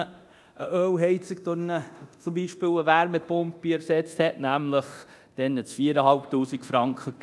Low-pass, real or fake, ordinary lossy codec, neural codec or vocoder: none; fake; none; codec, 24 kHz, 0.9 kbps, DualCodec